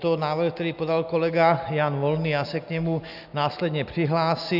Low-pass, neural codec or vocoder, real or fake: 5.4 kHz; none; real